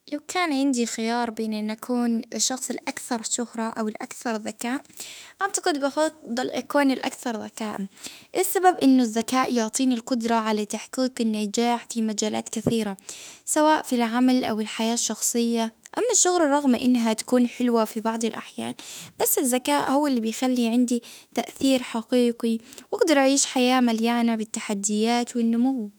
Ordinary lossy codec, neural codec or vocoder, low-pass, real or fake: none; autoencoder, 48 kHz, 32 numbers a frame, DAC-VAE, trained on Japanese speech; none; fake